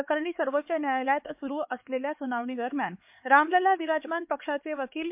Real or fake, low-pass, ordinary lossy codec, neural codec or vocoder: fake; 3.6 kHz; MP3, 32 kbps; codec, 16 kHz, 4 kbps, X-Codec, HuBERT features, trained on LibriSpeech